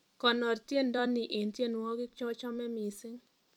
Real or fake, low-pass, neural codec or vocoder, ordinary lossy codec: fake; 19.8 kHz; vocoder, 44.1 kHz, 128 mel bands every 256 samples, BigVGAN v2; none